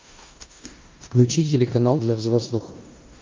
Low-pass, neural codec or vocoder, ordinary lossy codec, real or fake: 7.2 kHz; codec, 16 kHz in and 24 kHz out, 0.9 kbps, LongCat-Audio-Codec, four codebook decoder; Opus, 24 kbps; fake